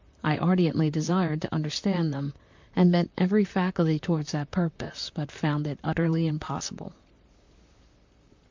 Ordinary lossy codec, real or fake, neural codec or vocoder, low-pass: MP3, 64 kbps; fake; vocoder, 44.1 kHz, 128 mel bands, Pupu-Vocoder; 7.2 kHz